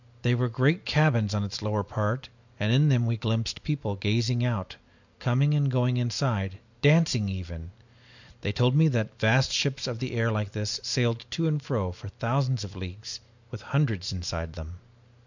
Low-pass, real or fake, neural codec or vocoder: 7.2 kHz; real; none